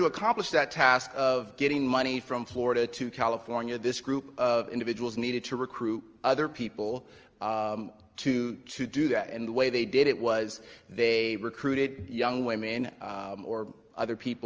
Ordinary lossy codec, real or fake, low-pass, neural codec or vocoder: Opus, 24 kbps; real; 7.2 kHz; none